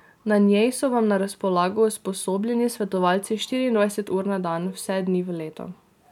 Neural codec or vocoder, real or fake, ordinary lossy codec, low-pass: none; real; none; 19.8 kHz